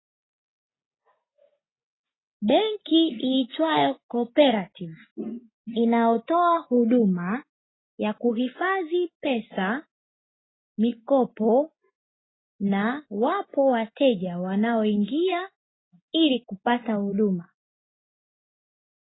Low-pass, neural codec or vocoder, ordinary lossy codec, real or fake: 7.2 kHz; none; AAC, 16 kbps; real